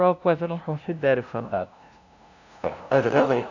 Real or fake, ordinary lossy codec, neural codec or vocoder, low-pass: fake; none; codec, 16 kHz, 0.5 kbps, FunCodec, trained on LibriTTS, 25 frames a second; 7.2 kHz